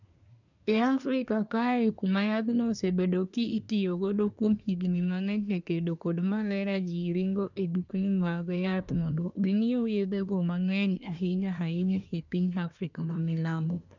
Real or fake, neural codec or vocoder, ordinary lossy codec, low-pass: fake; codec, 24 kHz, 1 kbps, SNAC; none; 7.2 kHz